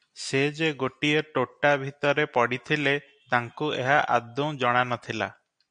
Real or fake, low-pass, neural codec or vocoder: real; 9.9 kHz; none